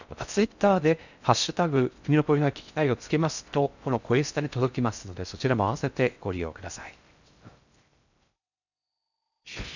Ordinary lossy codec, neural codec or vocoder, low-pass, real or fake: none; codec, 16 kHz in and 24 kHz out, 0.6 kbps, FocalCodec, streaming, 4096 codes; 7.2 kHz; fake